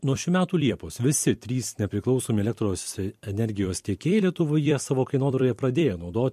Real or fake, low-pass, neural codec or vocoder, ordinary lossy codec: fake; 14.4 kHz; vocoder, 44.1 kHz, 128 mel bands every 256 samples, BigVGAN v2; MP3, 64 kbps